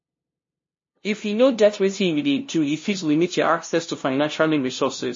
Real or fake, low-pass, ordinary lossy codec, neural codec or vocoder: fake; 7.2 kHz; MP3, 32 kbps; codec, 16 kHz, 0.5 kbps, FunCodec, trained on LibriTTS, 25 frames a second